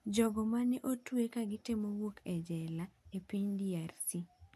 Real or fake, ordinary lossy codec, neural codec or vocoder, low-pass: real; MP3, 96 kbps; none; 14.4 kHz